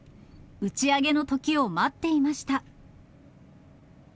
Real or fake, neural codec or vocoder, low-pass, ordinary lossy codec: real; none; none; none